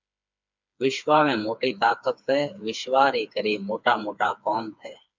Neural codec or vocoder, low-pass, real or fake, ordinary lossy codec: codec, 16 kHz, 4 kbps, FreqCodec, smaller model; 7.2 kHz; fake; MP3, 64 kbps